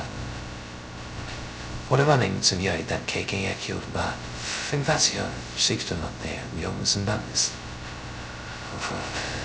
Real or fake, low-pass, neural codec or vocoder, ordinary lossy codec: fake; none; codec, 16 kHz, 0.2 kbps, FocalCodec; none